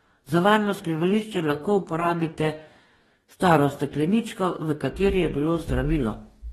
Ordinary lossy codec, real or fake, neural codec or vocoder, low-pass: AAC, 32 kbps; fake; codec, 44.1 kHz, 2.6 kbps, DAC; 19.8 kHz